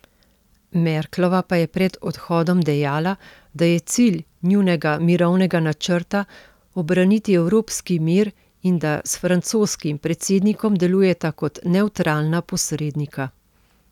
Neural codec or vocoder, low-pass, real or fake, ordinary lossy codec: none; 19.8 kHz; real; none